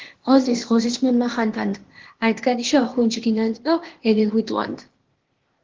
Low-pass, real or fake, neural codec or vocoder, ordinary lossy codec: 7.2 kHz; fake; codec, 16 kHz, 0.8 kbps, ZipCodec; Opus, 16 kbps